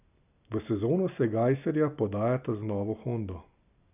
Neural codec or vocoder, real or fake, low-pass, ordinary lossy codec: none; real; 3.6 kHz; none